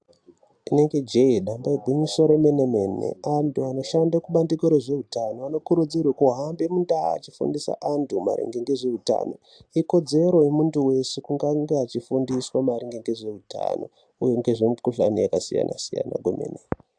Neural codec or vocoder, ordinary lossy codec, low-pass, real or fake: none; AAC, 64 kbps; 9.9 kHz; real